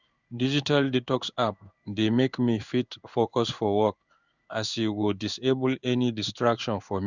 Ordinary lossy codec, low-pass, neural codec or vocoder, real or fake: Opus, 64 kbps; 7.2 kHz; codec, 16 kHz in and 24 kHz out, 1 kbps, XY-Tokenizer; fake